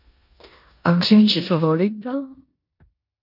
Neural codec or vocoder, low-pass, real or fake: codec, 16 kHz in and 24 kHz out, 0.9 kbps, LongCat-Audio-Codec, four codebook decoder; 5.4 kHz; fake